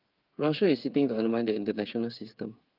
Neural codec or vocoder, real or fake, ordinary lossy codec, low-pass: codec, 16 kHz, 8 kbps, FreqCodec, smaller model; fake; Opus, 32 kbps; 5.4 kHz